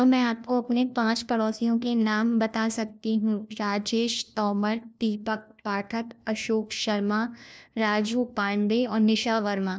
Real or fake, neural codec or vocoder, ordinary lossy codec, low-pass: fake; codec, 16 kHz, 1 kbps, FunCodec, trained on LibriTTS, 50 frames a second; none; none